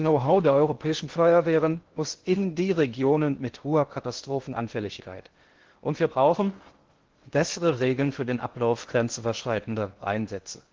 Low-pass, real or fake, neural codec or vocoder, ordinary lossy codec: 7.2 kHz; fake; codec, 16 kHz in and 24 kHz out, 0.6 kbps, FocalCodec, streaming, 2048 codes; Opus, 16 kbps